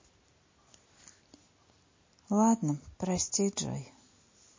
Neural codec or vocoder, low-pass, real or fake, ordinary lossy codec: none; 7.2 kHz; real; MP3, 32 kbps